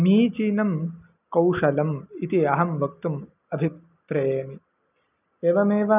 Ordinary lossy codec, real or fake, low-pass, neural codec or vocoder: none; real; 3.6 kHz; none